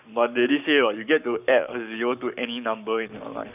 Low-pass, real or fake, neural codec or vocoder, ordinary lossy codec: 3.6 kHz; fake; codec, 44.1 kHz, 7.8 kbps, Pupu-Codec; none